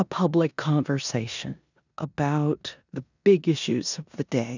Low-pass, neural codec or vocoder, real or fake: 7.2 kHz; codec, 16 kHz in and 24 kHz out, 0.9 kbps, LongCat-Audio-Codec, four codebook decoder; fake